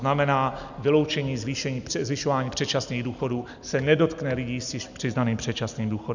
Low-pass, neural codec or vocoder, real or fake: 7.2 kHz; none; real